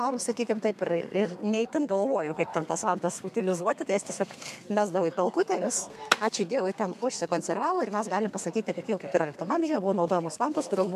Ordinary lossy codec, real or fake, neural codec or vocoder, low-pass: MP3, 96 kbps; fake; codec, 32 kHz, 1.9 kbps, SNAC; 14.4 kHz